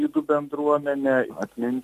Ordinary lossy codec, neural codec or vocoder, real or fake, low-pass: MP3, 64 kbps; none; real; 14.4 kHz